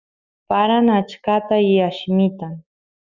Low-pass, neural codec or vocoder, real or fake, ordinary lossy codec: 7.2 kHz; autoencoder, 48 kHz, 128 numbers a frame, DAC-VAE, trained on Japanese speech; fake; Opus, 64 kbps